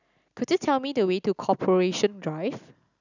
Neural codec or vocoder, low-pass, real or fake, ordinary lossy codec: none; 7.2 kHz; real; none